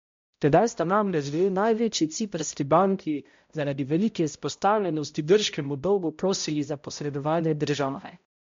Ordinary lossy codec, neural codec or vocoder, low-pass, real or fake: MP3, 48 kbps; codec, 16 kHz, 0.5 kbps, X-Codec, HuBERT features, trained on balanced general audio; 7.2 kHz; fake